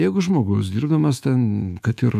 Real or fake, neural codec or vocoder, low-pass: fake; autoencoder, 48 kHz, 128 numbers a frame, DAC-VAE, trained on Japanese speech; 14.4 kHz